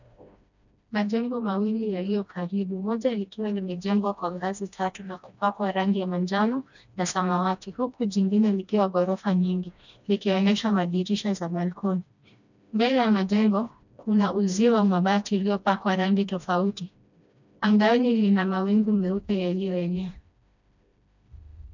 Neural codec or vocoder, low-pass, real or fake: codec, 16 kHz, 1 kbps, FreqCodec, smaller model; 7.2 kHz; fake